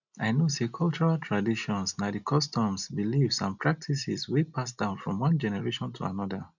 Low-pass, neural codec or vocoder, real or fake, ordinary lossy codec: 7.2 kHz; none; real; none